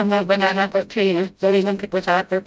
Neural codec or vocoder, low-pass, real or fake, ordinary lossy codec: codec, 16 kHz, 0.5 kbps, FreqCodec, smaller model; none; fake; none